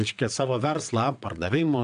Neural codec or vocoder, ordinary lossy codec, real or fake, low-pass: vocoder, 22.05 kHz, 80 mel bands, WaveNeXt; AAC, 96 kbps; fake; 9.9 kHz